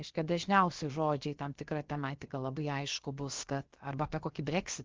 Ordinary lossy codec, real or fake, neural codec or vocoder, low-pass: Opus, 16 kbps; fake; codec, 16 kHz, about 1 kbps, DyCAST, with the encoder's durations; 7.2 kHz